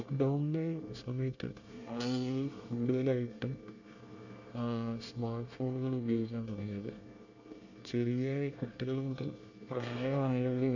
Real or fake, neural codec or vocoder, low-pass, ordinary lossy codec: fake; codec, 24 kHz, 1 kbps, SNAC; 7.2 kHz; none